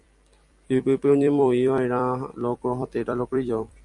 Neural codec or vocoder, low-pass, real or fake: vocoder, 44.1 kHz, 128 mel bands every 256 samples, BigVGAN v2; 10.8 kHz; fake